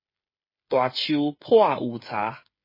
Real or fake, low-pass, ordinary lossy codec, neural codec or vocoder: fake; 5.4 kHz; MP3, 24 kbps; codec, 16 kHz, 8 kbps, FreqCodec, smaller model